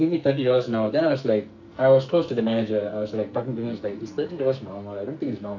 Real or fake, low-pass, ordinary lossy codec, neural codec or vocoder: fake; 7.2 kHz; none; codec, 44.1 kHz, 2.6 kbps, SNAC